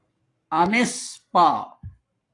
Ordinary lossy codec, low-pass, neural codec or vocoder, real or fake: AAC, 48 kbps; 10.8 kHz; codec, 44.1 kHz, 7.8 kbps, Pupu-Codec; fake